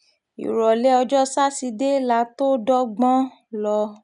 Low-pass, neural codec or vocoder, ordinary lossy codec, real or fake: 10.8 kHz; none; none; real